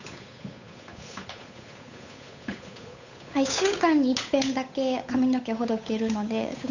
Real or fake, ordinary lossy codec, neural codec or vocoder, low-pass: fake; none; codec, 16 kHz, 8 kbps, FunCodec, trained on Chinese and English, 25 frames a second; 7.2 kHz